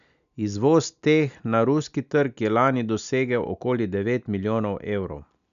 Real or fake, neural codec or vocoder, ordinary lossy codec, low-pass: real; none; none; 7.2 kHz